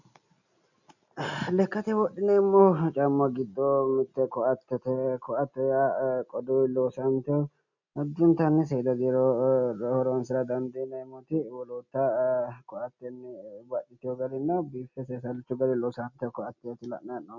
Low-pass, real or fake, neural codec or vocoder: 7.2 kHz; real; none